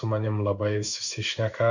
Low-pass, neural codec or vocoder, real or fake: 7.2 kHz; codec, 16 kHz in and 24 kHz out, 1 kbps, XY-Tokenizer; fake